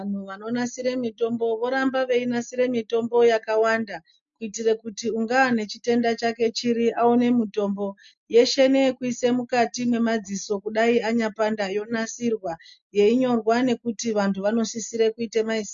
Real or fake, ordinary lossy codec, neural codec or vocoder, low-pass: real; MP3, 48 kbps; none; 7.2 kHz